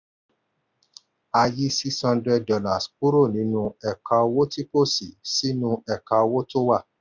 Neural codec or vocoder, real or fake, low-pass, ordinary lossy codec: none; real; 7.2 kHz; none